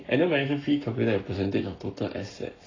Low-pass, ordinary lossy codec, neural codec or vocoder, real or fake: 7.2 kHz; AAC, 32 kbps; codec, 44.1 kHz, 7.8 kbps, Pupu-Codec; fake